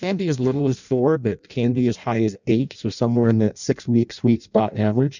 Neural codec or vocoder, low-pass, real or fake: codec, 16 kHz in and 24 kHz out, 0.6 kbps, FireRedTTS-2 codec; 7.2 kHz; fake